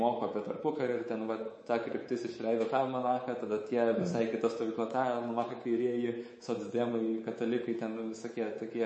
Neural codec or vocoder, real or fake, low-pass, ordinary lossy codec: codec, 24 kHz, 3.1 kbps, DualCodec; fake; 10.8 kHz; MP3, 32 kbps